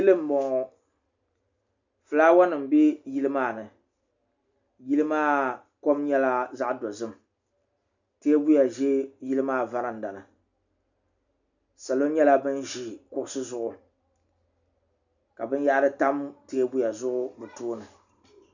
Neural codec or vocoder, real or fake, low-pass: none; real; 7.2 kHz